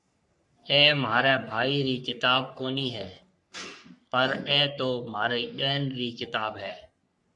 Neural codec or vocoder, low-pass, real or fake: codec, 44.1 kHz, 3.4 kbps, Pupu-Codec; 10.8 kHz; fake